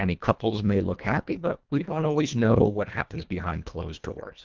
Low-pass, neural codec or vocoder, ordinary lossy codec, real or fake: 7.2 kHz; codec, 24 kHz, 1.5 kbps, HILCodec; Opus, 24 kbps; fake